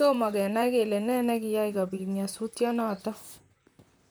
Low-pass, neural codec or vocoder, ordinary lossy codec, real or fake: none; vocoder, 44.1 kHz, 128 mel bands, Pupu-Vocoder; none; fake